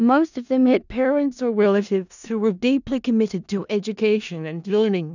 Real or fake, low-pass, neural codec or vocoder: fake; 7.2 kHz; codec, 16 kHz in and 24 kHz out, 0.4 kbps, LongCat-Audio-Codec, four codebook decoder